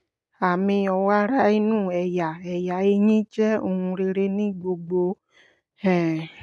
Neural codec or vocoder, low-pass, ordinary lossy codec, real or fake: none; none; none; real